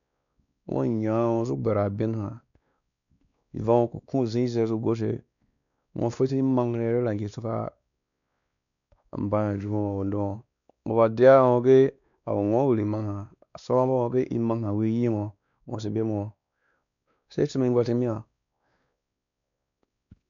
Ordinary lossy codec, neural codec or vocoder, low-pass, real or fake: none; codec, 16 kHz, 2 kbps, X-Codec, WavLM features, trained on Multilingual LibriSpeech; 7.2 kHz; fake